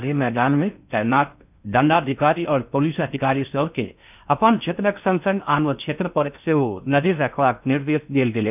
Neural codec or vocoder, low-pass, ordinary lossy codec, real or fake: codec, 16 kHz in and 24 kHz out, 0.6 kbps, FocalCodec, streaming, 4096 codes; 3.6 kHz; none; fake